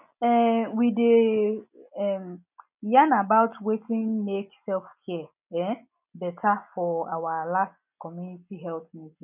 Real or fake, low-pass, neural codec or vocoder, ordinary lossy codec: real; 3.6 kHz; none; none